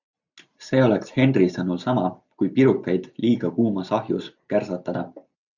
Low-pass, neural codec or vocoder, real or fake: 7.2 kHz; none; real